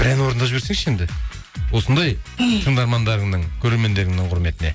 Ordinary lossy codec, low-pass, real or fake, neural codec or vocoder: none; none; real; none